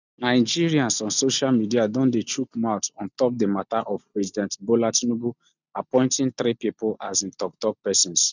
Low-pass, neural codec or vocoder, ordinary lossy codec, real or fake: 7.2 kHz; none; none; real